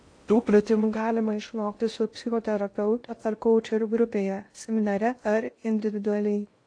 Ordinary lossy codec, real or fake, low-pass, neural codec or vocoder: AAC, 48 kbps; fake; 9.9 kHz; codec, 16 kHz in and 24 kHz out, 0.6 kbps, FocalCodec, streaming, 2048 codes